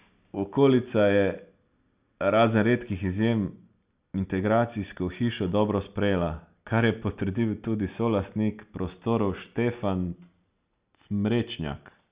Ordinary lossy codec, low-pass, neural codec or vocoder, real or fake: Opus, 64 kbps; 3.6 kHz; none; real